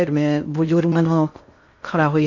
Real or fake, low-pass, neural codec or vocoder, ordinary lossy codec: fake; 7.2 kHz; codec, 16 kHz in and 24 kHz out, 0.6 kbps, FocalCodec, streaming, 2048 codes; MP3, 64 kbps